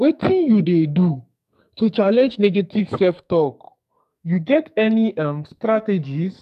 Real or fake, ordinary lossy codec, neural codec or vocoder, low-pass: fake; none; codec, 44.1 kHz, 2.6 kbps, SNAC; 14.4 kHz